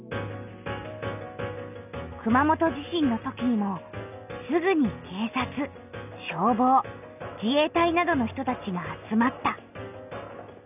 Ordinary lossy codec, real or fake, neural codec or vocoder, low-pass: none; real; none; 3.6 kHz